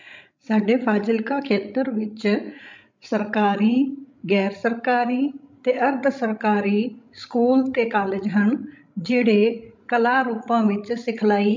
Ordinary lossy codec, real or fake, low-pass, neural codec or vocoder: MP3, 64 kbps; fake; 7.2 kHz; codec, 16 kHz, 16 kbps, FreqCodec, larger model